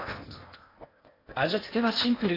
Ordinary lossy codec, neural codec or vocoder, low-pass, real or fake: AAC, 24 kbps; codec, 16 kHz in and 24 kHz out, 0.8 kbps, FocalCodec, streaming, 65536 codes; 5.4 kHz; fake